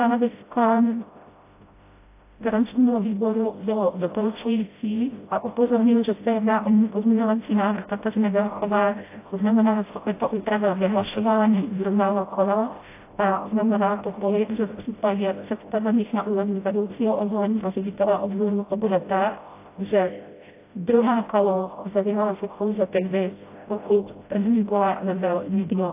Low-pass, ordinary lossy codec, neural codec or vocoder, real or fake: 3.6 kHz; AAC, 24 kbps; codec, 16 kHz, 0.5 kbps, FreqCodec, smaller model; fake